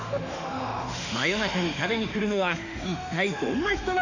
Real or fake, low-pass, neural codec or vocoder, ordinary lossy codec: fake; 7.2 kHz; autoencoder, 48 kHz, 32 numbers a frame, DAC-VAE, trained on Japanese speech; none